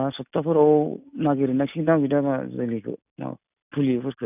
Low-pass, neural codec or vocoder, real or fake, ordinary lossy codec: 3.6 kHz; none; real; none